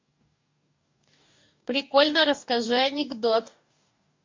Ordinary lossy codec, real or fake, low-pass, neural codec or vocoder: MP3, 48 kbps; fake; 7.2 kHz; codec, 44.1 kHz, 2.6 kbps, DAC